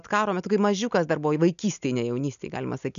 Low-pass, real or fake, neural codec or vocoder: 7.2 kHz; real; none